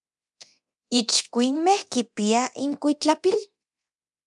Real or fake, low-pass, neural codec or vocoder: fake; 10.8 kHz; codec, 24 kHz, 0.9 kbps, DualCodec